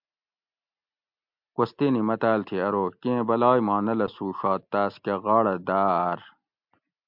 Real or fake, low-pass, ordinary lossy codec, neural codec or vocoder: real; 5.4 kHz; MP3, 48 kbps; none